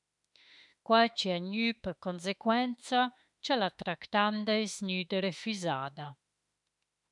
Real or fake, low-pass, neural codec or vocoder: fake; 10.8 kHz; autoencoder, 48 kHz, 32 numbers a frame, DAC-VAE, trained on Japanese speech